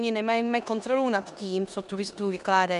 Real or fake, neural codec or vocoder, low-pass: fake; codec, 16 kHz in and 24 kHz out, 0.9 kbps, LongCat-Audio-Codec, four codebook decoder; 10.8 kHz